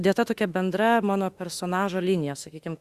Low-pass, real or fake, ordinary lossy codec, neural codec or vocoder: 14.4 kHz; fake; Opus, 64 kbps; autoencoder, 48 kHz, 32 numbers a frame, DAC-VAE, trained on Japanese speech